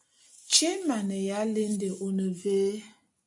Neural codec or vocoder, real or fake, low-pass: none; real; 10.8 kHz